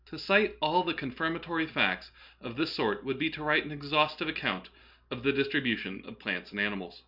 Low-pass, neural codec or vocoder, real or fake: 5.4 kHz; none; real